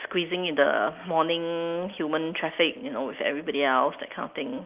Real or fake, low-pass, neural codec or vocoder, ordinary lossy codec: real; 3.6 kHz; none; Opus, 32 kbps